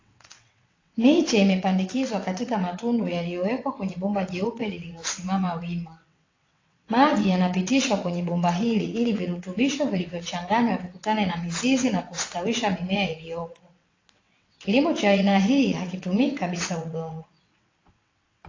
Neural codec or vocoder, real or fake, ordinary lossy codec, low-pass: vocoder, 22.05 kHz, 80 mel bands, WaveNeXt; fake; AAC, 32 kbps; 7.2 kHz